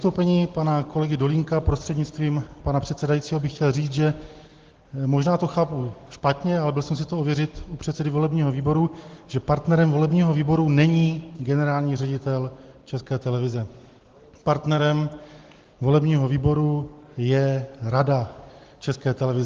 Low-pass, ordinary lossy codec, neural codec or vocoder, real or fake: 7.2 kHz; Opus, 16 kbps; none; real